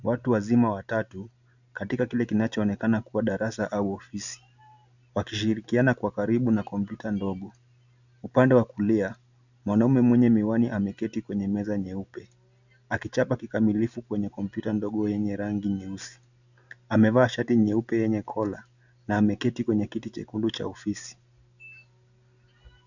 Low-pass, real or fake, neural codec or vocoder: 7.2 kHz; real; none